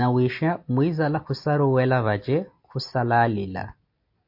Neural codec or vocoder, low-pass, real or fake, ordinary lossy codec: none; 5.4 kHz; real; MP3, 32 kbps